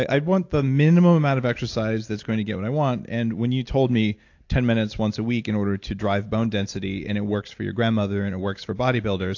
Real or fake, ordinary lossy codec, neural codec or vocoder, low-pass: real; AAC, 48 kbps; none; 7.2 kHz